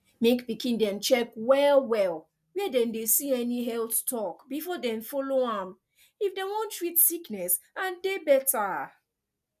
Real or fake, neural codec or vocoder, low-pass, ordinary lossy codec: real; none; 14.4 kHz; none